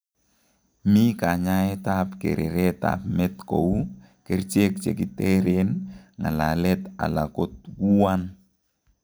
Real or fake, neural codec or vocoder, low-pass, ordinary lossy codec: real; none; none; none